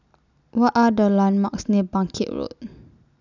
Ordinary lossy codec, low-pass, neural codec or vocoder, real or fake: none; 7.2 kHz; none; real